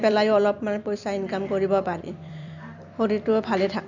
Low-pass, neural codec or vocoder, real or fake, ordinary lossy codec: 7.2 kHz; vocoder, 44.1 kHz, 128 mel bands every 256 samples, BigVGAN v2; fake; none